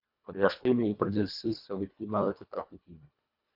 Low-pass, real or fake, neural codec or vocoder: 5.4 kHz; fake; codec, 24 kHz, 1.5 kbps, HILCodec